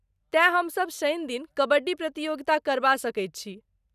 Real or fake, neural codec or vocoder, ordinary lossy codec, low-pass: real; none; none; 14.4 kHz